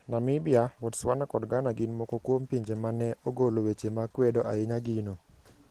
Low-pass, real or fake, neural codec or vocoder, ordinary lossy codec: 14.4 kHz; real; none; Opus, 16 kbps